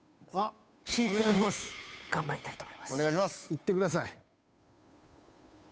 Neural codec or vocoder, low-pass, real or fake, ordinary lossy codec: codec, 16 kHz, 2 kbps, FunCodec, trained on Chinese and English, 25 frames a second; none; fake; none